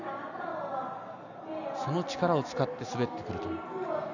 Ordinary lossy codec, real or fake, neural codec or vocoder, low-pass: none; real; none; 7.2 kHz